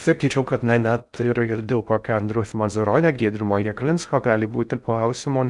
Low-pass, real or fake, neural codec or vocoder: 10.8 kHz; fake; codec, 16 kHz in and 24 kHz out, 0.6 kbps, FocalCodec, streaming, 4096 codes